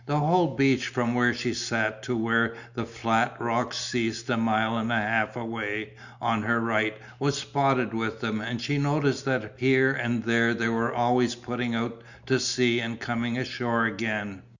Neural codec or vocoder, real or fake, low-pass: none; real; 7.2 kHz